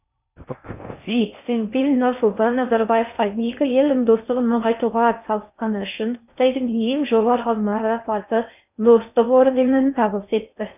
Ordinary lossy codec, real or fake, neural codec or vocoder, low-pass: none; fake; codec, 16 kHz in and 24 kHz out, 0.6 kbps, FocalCodec, streaming, 4096 codes; 3.6 kHz